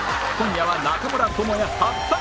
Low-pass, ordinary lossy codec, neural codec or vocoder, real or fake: none; none; none; real